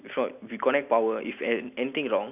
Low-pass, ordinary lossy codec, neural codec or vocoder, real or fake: 3.6 kHz; none; none; real